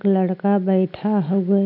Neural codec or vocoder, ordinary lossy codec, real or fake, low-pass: none; none; real; 5.4 kHz